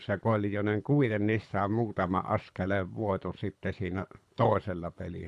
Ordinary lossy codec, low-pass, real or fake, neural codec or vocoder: none; none; fake; codec, 24 kHz, 6 kbps, HILCodec